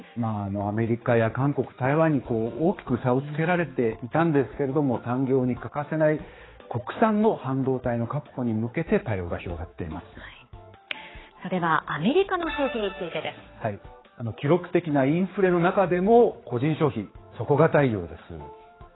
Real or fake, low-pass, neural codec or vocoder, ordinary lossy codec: fake; 7.2 kHz; codec, 16 kHz, 4 kbps, X-Codec, HuBERT features, trained on general audio; AAC, 16 kbps